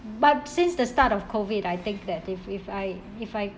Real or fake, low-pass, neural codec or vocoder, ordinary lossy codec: real; none; none; none